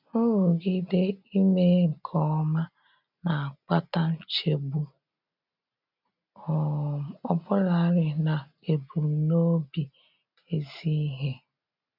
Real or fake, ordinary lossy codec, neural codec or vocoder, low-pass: real; none; none; 5.4 kHz